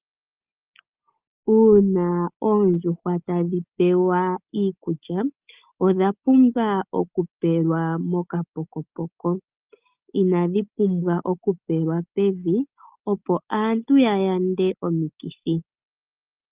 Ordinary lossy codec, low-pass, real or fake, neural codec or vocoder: Opus, 32 kbps; 3.6 kHz; real; none